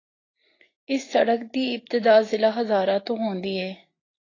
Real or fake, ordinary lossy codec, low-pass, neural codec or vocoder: real; AAC, 32 kbps; 7.2 kHz; none